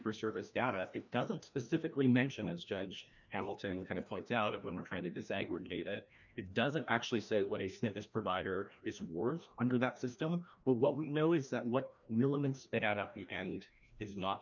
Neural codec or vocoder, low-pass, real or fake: codec, 16 kHz, 1 kbps, FreqCodec, larger model; 7.2 kHz; fake